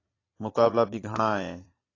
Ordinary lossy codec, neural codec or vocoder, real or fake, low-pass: AAC, 32 kbps; vocoder, 22.05 kHz, 80 mel bands, Vocos; fake; 7.2 kHz